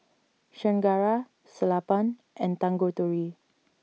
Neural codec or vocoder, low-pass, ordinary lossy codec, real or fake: none; none; none; real